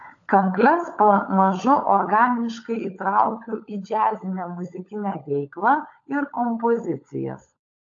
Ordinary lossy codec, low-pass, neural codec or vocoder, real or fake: AAC, 48 kbps; 7.2 kHz; codec, 16 kHz, 16 kbps, FunCodec, trained on LibriTTS, 50 frames a second; fake